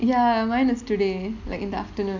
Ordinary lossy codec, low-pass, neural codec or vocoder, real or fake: none; 7.2 kHz; none; real